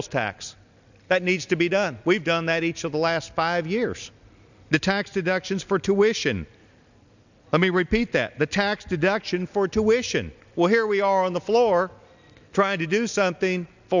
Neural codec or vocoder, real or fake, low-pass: none; real; 7.2 kHz